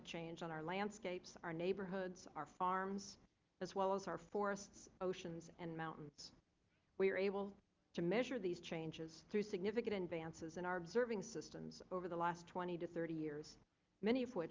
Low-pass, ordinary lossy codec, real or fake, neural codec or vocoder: 7.2 kHz; Opus, 32 kbps; real; none